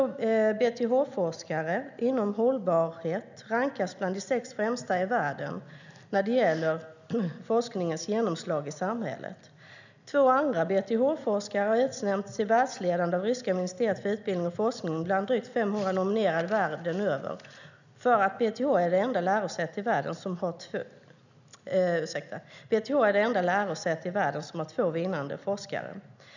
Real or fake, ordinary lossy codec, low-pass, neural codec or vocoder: real; none; 7.2 kHz; none